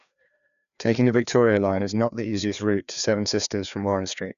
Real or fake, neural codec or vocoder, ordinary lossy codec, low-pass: fake; codec, 16 kHz, 2 kbps, FreqCodec, larger model; none; 7.2 kHz